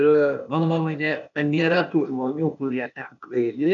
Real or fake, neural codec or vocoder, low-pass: fake; codec, 16 kHz, 0.8 kbps, ZipCodec; 7.2 kHz